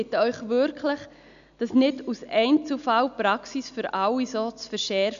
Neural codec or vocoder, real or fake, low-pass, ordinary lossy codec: none; real; 7.2 kHz; none